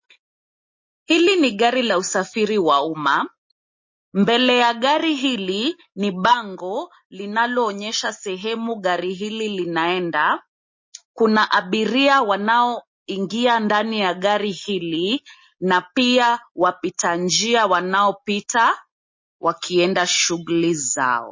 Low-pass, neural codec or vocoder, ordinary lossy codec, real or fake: 7.2 kHz; none; MP3, 32 kbps; real